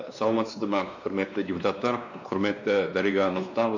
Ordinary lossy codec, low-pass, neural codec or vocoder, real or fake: none; 7.2 kHz; codec, 16 kHz, 2 kbps, X-Codec, WavLM features, trained on Multilingual LibriSpeech; fake